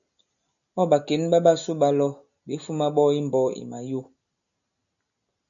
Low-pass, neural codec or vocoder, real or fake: 7.2 kHz; none; real